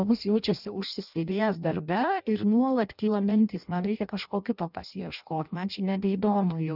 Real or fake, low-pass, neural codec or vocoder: fake; 5.4 kHz; codec, 16 kHz in and 24 kHz out, 0.6 kbps, FireRedTTS-2 codec